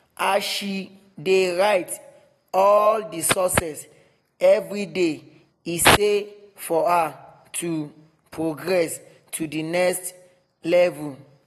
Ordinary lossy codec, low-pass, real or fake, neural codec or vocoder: AAC, 48 kbps; 14.4 kHz; real; none